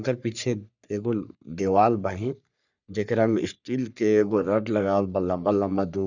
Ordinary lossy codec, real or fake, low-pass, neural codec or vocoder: none; fake; 7.2 kHz; codec, 44.1 kHz, 3.4 kbps, Pupu-Codec